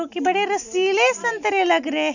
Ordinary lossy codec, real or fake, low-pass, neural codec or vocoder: none; real; 7.2 kHz; none